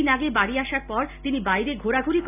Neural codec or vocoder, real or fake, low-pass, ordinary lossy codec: none; real; 3.6 kHz; AAC, 32 kbps